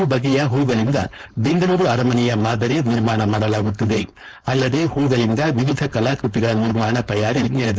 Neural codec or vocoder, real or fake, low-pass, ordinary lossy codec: codec, 16 kHz, 4.8 kbps, FACodec; fake; none; none